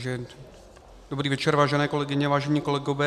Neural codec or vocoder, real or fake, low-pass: none; real; 14.4 kHz